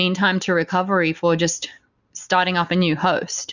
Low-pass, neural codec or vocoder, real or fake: 7.2 kHz; none; real